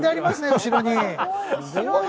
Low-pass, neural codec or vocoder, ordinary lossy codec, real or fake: none; none; none; real